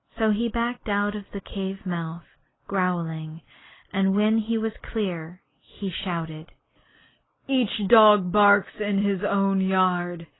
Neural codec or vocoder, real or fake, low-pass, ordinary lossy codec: none; real; 7.2 kHz; AAC, 16 kbps